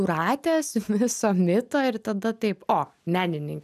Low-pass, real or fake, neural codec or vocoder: 14.4 kHz; real; none